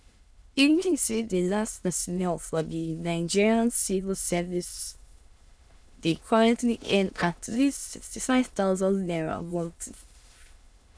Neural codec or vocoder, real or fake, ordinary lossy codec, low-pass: autoencoder, 22.05 kHz, a latent of 192 numbers a frame, VITS, trained on many speakers; fake; none; none